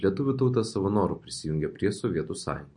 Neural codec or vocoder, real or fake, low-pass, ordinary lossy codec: none; real; 9.9 kHz; MP3, 48 kbps